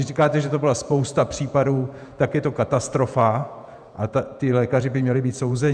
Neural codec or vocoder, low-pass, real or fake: vocoder, 48 kHz, 128 mel bands, Vocos; 9.9 kHz; fake